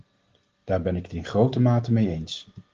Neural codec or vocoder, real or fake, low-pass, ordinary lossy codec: none; real; 7.2 kHz; Opus, 16 kbps